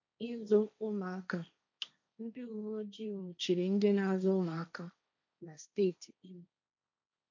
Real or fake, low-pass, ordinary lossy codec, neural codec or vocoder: fake; 7.2 kHz; MP3, 64 kbps; codec, 16 kHz, 1.1 kbps, Voila-Tokenizer